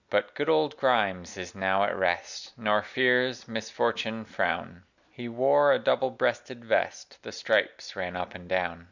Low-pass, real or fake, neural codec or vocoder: 7.2 kHz; real; none